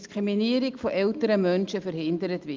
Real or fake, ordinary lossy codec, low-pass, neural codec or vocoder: real; Opus, 24 kbps; 7.2 kHz; none